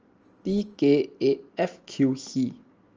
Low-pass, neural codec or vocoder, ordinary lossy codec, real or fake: 7.2 kHz; none; Opus, 24 kbps; real